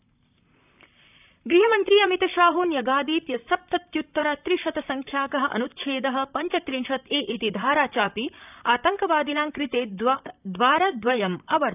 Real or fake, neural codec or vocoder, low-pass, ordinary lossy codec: fake; vocoder, 44.1 kHz, 128 mel bands, Pupu-Vocoder; 3.6 kHz; none